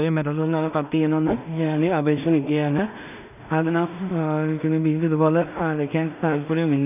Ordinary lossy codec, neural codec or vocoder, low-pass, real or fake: none; codec, 16 kHz in and 24 kHz out, 0.4 kbps, LongCat-Audio-Codec, two codebook decoder; 3.6 kHz; fake